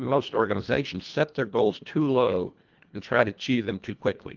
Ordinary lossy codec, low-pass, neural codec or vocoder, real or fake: Opus, 32 kbps; 7.2 kHz; codec, 24 kHz, 1.5 kbps, HILCodec; fake